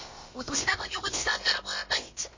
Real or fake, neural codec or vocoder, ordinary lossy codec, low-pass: fake; codec, 16 kHz, about 1 kbps, DyCAST, with the encoder's durations; MP3, 32 kbps; 7.2 kHz